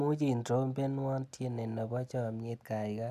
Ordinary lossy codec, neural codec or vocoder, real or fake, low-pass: none; none; real; 14.4 kHz